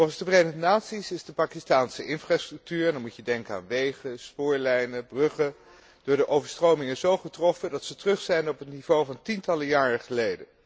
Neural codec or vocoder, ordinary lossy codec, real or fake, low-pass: none; none; real; none